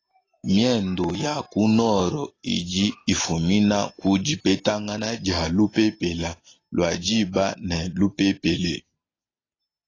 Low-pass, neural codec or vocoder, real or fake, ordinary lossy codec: 7.2 kHz; none; real; AAC, 32 kbps